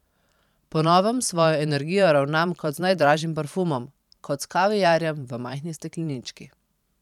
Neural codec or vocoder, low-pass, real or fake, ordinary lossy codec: vocoder, 44.1 kHz, 128 mel bands every 512 samples, BigVGAN v2; 19.8 kHz; fake; none